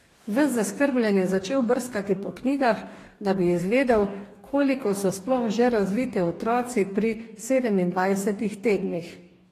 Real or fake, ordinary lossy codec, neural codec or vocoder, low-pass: fake; AAC, 48 kbps; codec, 44.1 kHz, 2.6 kbps, DAC; 14.4 kHz